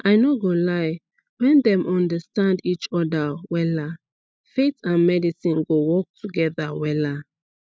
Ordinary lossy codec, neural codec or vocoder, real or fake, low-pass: none; none; real; none